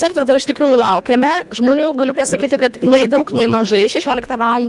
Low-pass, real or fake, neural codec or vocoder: 10.8 kHz; fake; codec, 24 kHz, 1.5 kbps, HILCodec